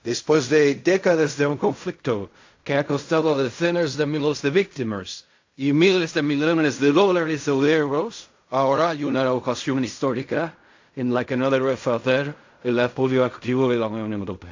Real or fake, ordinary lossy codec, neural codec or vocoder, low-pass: fake; AAC, 48 kbps; codec, 16 kHz in and 24 kHz out, 0.4 kbps, LongCat-Audio-Codec, fine tuned four codebook decoder; 7.2 kHz